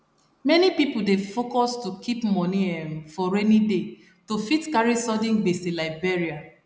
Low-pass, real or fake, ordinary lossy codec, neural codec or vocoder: none; real; none; none